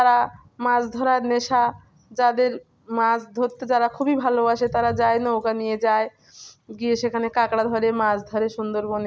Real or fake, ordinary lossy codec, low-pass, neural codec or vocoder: real; none; none; none